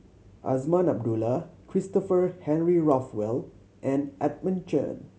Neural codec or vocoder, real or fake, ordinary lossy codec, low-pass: none; real; none; none